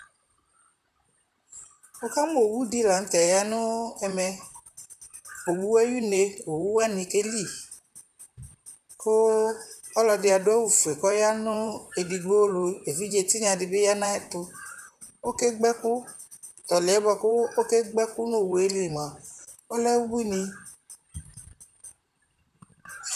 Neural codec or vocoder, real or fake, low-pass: vocoder, 44.1 kHz, 128 mel bands, Pupu-Vocoder; fake; 14.4 kHz